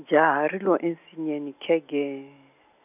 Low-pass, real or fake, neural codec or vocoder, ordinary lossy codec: 3.6 kHz; real; none; none